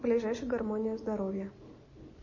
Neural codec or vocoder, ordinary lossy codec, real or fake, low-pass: none; MP3, 32 kbps; real; 7.2 kHz